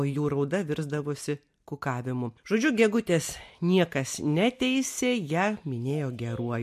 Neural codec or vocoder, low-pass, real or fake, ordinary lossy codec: none; 14.4 kHz; real; MP3, 64 kbps